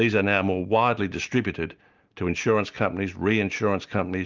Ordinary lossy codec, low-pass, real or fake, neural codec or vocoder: Opus, 24 kbps; 7.2 kHz; real; none